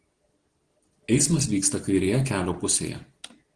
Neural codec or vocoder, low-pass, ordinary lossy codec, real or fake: none; 9.9 kHz; Opus, 16 kbps; real